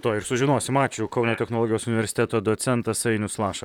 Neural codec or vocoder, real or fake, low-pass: vocoder, 44.1 kHz, 128 mel bands, Pupu-Vocoder; fake; 19.8 kHz